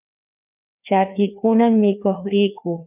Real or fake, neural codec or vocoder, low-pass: fake; codec, 16 kHz, 2 kbps, FreqCodec, larger model; 3.6 kHz